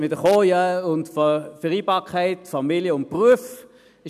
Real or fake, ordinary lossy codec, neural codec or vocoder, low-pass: real; none; none; 14.4 kHz